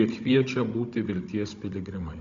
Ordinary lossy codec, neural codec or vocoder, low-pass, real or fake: MP3, 64 kbps; codec, 16 kHz, 16 kbps, FunCodec, trained on Chinese and English, 50 frames a second; 7.2 kHz; fake